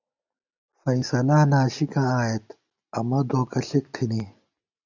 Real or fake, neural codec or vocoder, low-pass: real; none; 7.2 kHz